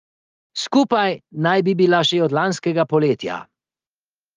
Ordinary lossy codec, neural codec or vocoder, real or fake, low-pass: Opus, 32 kbps; none; real; 7.2 kHz